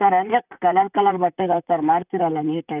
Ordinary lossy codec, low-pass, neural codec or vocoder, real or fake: none; 3.6 kHz; vocoder, 44.1 kHz, 128 mel bands, Pupu-Vocoder; fake